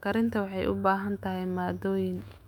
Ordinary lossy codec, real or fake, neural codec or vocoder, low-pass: MP3, 96 kbps; fake; autoencoder, 48 kHz, 128 numbers a frame, DAC-VAE, trained on Japanese speech; 19.8 kHz